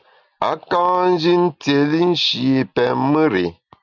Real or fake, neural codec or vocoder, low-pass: real; none; 7.2 kHz